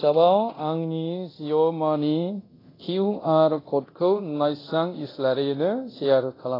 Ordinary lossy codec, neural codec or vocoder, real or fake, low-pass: AAC, 24 kbps; codec, 24 kHz, 0.9 kbps, DualCodec; fake; 5.4 kHz